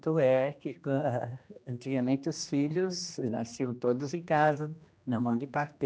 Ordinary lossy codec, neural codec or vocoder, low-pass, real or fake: none; codec, 16 kHz, 1 kbps, X-Codec, HuBERT features, trained on general audio; none; fake